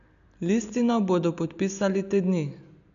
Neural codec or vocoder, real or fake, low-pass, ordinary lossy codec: none; real; 7.2 kHz; none